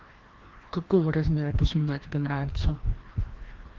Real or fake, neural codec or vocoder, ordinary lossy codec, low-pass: fake; codec, 16 kHz, 1 kbps, FreqCodec, larger model; Opus, 16 kbps; 7.2 kHz